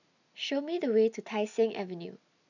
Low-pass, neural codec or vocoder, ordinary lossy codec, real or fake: 7.2 kHz; none; none; real